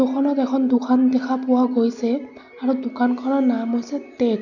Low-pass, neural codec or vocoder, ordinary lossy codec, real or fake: 7.2 kHz; none; none; real